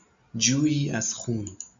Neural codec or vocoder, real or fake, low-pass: none; real; 7.2 kHz